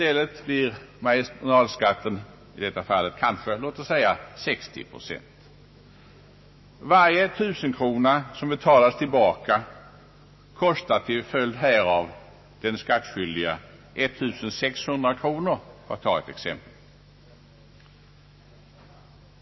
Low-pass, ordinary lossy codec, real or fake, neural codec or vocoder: 7.2 kHz; MP3, 24 kbps; fake; autoencoder, 48 kHz, 128 numbers a frame, DAC-VAE, trained on Japanese speech